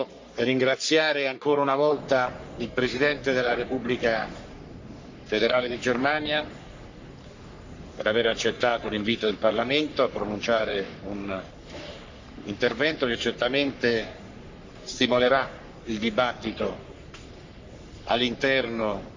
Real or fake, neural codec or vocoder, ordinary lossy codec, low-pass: fake; codec, 44.1 kHz, 3.4 kbps, Pupu-Codec; MP3, 64 kbps; 7.2 kHz